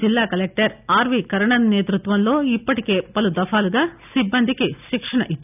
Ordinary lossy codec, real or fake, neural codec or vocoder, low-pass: none; real; none; 3.6 kHz